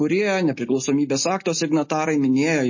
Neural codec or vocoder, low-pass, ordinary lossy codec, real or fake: none; 7.2 kHz; MP3, 32 kbps; real